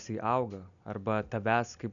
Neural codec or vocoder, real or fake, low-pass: none; real; 7.2 kHz